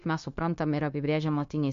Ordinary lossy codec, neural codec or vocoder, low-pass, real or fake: MP3, 48 kbps; codec, 16 kHz, 0.9 kbps, LongCat-Audio-Codec; 7.2 kHz; fake